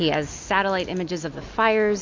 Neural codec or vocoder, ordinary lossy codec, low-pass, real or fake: none; MP3, 48 kbps; 7.2 kHz; real